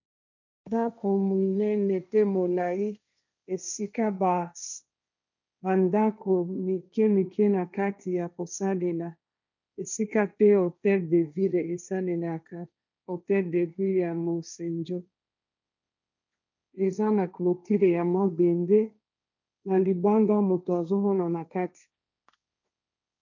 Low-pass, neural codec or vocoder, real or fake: 7.2 kHz; codec, 16 kHz, 1.1 kbps, Voila-Tokenizer; fake